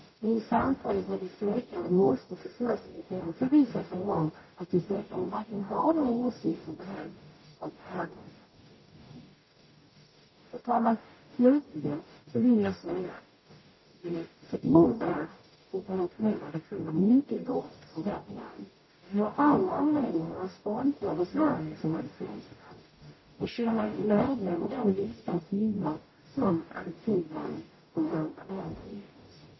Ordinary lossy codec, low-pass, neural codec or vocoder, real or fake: MP3, 24 kbps; 7.2 kHz; codec, 44.1 kHz, 0.9 kbps, DAC; fake